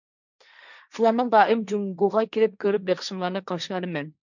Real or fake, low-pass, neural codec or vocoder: fake; 7.2 kHz; codec, 16 kHz, 1.1 kbps, Voila-Tokenizer